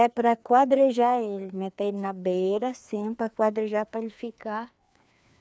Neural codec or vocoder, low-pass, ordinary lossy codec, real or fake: codec, 16 kHz, 2 kbps, FreqCodec, larger model; none; none; fake